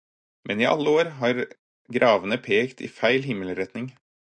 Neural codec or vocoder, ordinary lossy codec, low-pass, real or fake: none; AAC, 64 kbps; 9.9 kHz; real